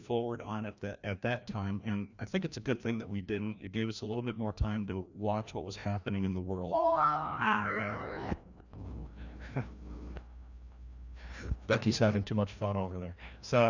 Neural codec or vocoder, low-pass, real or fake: codec, 16 kHz, 1 kbps, FreqCodec, larger model; 7.2 kHz; fake